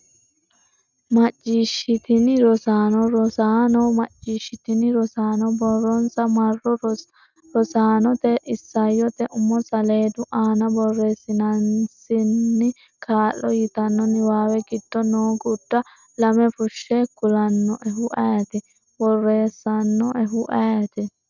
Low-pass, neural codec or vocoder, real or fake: 7.2 kHz; none; real